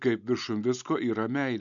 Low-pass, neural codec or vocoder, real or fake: 7.2 kHz; none; real